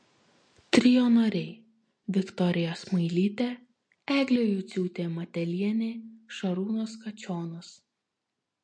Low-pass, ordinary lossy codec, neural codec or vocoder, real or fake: 9.9 kHz; MP3, 48 kbps; none; real